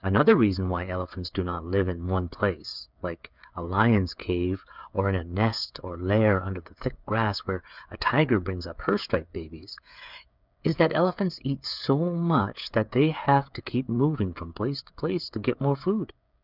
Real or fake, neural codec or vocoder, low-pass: fake; codec, 16 kHz, 8 kbps, FreqCodec, smaller model; 5.4 kHz